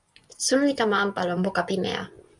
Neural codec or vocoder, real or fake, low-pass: vocoder, 24 kHz, 100 mel bands, Vocos; fake; 10.8 kHz